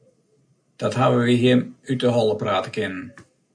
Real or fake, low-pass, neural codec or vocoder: real; 9.9 kHz; none